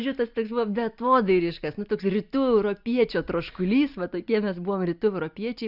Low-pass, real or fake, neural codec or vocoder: 5.4 kHz; real; none